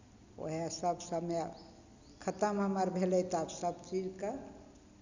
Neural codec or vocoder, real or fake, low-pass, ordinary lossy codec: none; real; 7.2 kHz; none